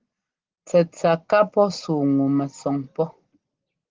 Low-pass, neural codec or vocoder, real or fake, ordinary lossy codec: 7.2 kHz; none; real; Opus, 16 kbps